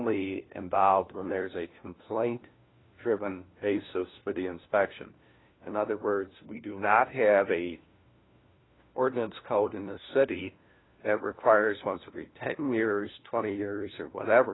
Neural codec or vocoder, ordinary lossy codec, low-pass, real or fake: codec, 16 kHz, 1 kbps, FunCodec, trained on LibriTTS, 50 frames a second; AAC, 16 kbps; 7.2 kHz; fake